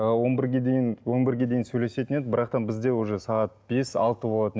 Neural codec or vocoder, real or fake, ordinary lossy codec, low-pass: none; real; none; none